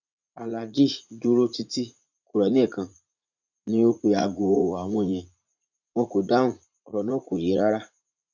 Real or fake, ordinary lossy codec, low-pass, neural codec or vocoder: fake; none; 7.2 kHz; vocoder, 44.1 kHz, 80 mel bands, Vocos